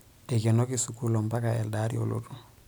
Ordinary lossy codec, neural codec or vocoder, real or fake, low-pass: none; none; real; none